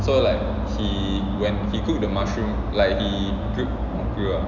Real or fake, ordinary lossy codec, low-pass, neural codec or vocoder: real; none; 7.2 kHz; none